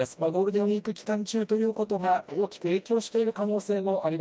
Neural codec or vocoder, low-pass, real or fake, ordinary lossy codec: codec, 16 kHz, 1 kbps, FreqCodec, smaller model; none; fake; none